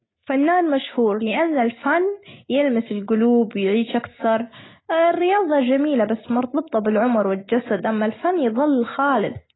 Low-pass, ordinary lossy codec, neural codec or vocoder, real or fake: 7.2 kHz; AAC, 16 kbps; none; real